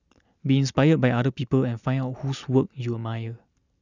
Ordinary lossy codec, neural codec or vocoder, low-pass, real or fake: none; none; 7.2 kHz; real